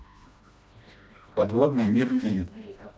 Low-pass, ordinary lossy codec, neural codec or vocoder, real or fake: none; none; codec, 16 kHz, 1 kbps, FreqCodec, smaller model; fake